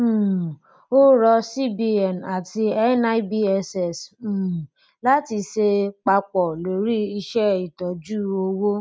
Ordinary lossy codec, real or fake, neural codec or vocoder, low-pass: none; real; none; none